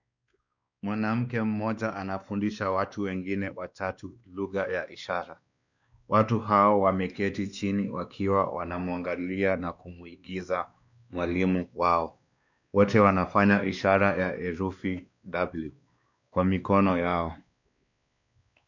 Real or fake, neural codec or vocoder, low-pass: fake; codec, 16 kHz, 2 kbps, X-Codec, WavLM features, trained on Multilingual LibriSpeech; 7.2 kHz